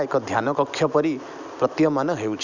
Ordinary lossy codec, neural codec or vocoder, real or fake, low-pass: none; none; real; 7.2 kHz